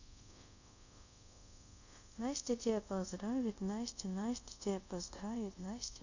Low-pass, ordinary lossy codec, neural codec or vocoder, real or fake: 7.2 kHz; none; codec, 24 kHz, 0.5 kbps, DualCodec; fake